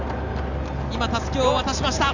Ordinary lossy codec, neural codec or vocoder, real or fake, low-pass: none; none; real; 7.2 kHz